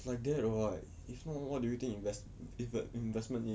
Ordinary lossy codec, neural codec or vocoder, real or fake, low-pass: none; none; real; none